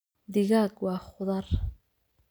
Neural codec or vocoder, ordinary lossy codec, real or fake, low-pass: none; none; real; none